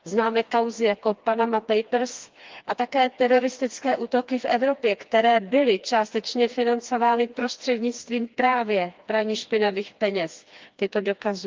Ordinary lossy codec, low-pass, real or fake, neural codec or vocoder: Opus, 32 kbps; 7.2 kHz; fake; codec, 16 kHz, 2 kbps, FreqCodec, smaller model